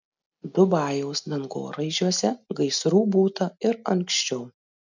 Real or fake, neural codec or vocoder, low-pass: real; none; 7.2 kHz